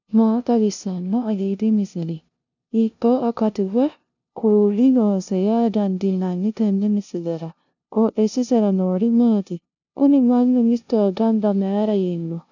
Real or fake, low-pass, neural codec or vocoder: fake; 7.2 kHz; codec, 16 kHz, 0.5 kbps, FunCodec, trained on LibriTTS, 25 frames a second